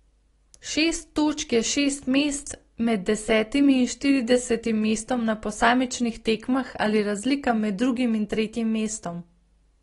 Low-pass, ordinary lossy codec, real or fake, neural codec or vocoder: 10.8 kHz; AAC, 32 kbps; real; none